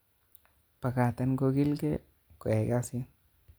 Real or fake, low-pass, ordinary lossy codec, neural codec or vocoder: real; none; none; none